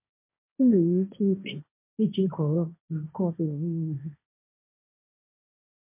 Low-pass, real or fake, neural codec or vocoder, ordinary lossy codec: 3.6 kHz; fake; codec, 16 kHz, 1.1 kbps, Voila-Tokenizer; MP3, 32 kbps